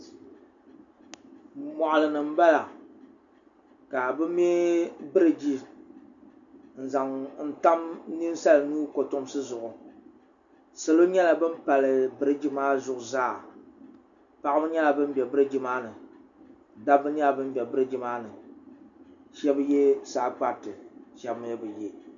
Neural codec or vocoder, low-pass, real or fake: none; 7.2 kHz; real